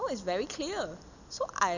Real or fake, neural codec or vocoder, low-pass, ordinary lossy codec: real; none; 7.2 kHz; none